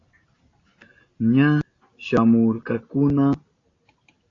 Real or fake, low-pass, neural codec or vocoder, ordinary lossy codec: real; 7.2 kHz; none; AAC, 32 kbps